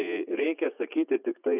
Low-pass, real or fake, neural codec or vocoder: 3.6 kHz; fake; vocoder, 44.1 kHz, 80 mel bands, Vocos